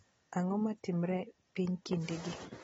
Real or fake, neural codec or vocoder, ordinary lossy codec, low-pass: real; none; AAC, 24 kbps; 9.9 kHz